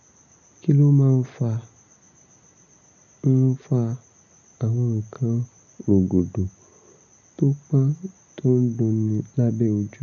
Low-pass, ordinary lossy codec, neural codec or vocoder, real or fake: 7.2 kHz; none; none; real